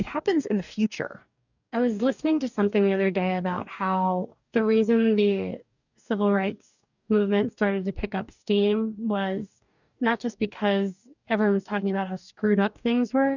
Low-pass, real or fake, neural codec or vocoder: 7.2 kHz; fake; codec, 44.1 kHz, 2.6 kbps, DAC